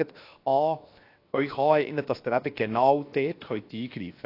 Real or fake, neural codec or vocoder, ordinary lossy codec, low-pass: fake; codec, 16 kHz, 0.7 kbps, FocalCodec; AAC, 32 kbps; 5.4 kHz